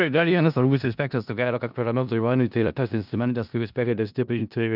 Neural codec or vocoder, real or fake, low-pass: codec, 16 kHz in and 24 kHz out, 0.4 kbps, LongCat-Audio-Codec, four codebook decoder; fake; 5.4 kHz